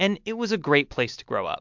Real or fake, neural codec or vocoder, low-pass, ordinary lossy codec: real; none; 7.2 kHz; MP3, 64 kbps